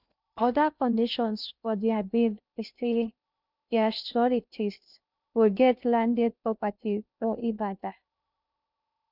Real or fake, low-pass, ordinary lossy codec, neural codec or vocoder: fake; 5.4 kHz; none; codec, 16 kHz in and 24 kHz out, 0.6 kbps, FocalCodec, streaming, 2048 codes